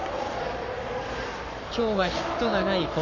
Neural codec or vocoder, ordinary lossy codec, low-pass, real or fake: codec, 44.1 kHz, 7.8 kbps, Pupu-Codec; none; 7.2 kHz; fake